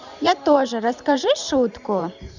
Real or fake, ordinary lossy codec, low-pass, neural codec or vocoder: fake; none; 7.2 kHz; vocoder, 44.1 kHz, 128 mel bands every 512 samples, BigVGAN v2